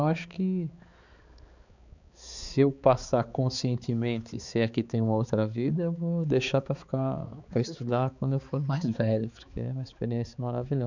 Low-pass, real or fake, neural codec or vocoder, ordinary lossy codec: 7.2 kHz; fake; codec, 16 kHz, 4 kbps, X-Codec, HuBERT features, trained on balanced general audio; none